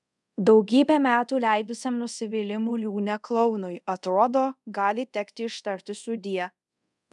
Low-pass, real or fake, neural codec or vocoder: 10.8 kHz; fake; codec, 24 kHz, 0.5 kbps, DualCodec